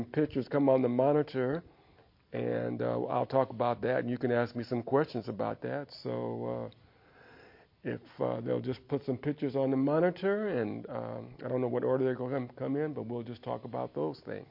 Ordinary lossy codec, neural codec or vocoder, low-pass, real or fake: MP3, 32 kbps; none; 5.4 kHz; real